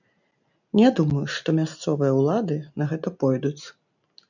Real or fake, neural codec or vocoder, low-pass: real; none; 7.2 kHz